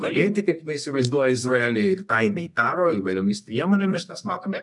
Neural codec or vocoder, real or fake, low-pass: codec, 24 kHz, 0.9 kbps, WavTokenizer, medium music audio release; fake; 10.8 kHz